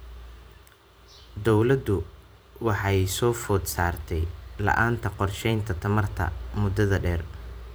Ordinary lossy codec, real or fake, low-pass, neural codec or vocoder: none; fake; none; vocoder, 44.1 kHz, 128 mel bands every 512 samples, BigVGAN v2